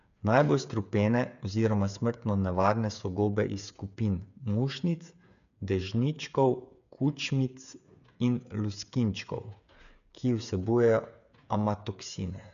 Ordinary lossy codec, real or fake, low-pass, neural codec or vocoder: none; fake; 7.2 kHz; codec, 16 kHz, 8 kbps, FreqCodec, smaller model